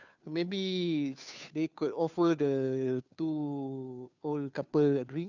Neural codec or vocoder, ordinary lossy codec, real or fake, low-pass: codec, 16 kHz, 2 kbps, FunCodec, trained on Chinese and English, 25 frames a second; none; fake; 7.2 kHz